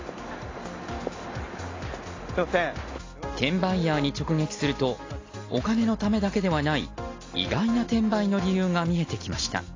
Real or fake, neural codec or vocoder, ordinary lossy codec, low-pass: real; none; AAC, 32 kbps; 7.2 kHz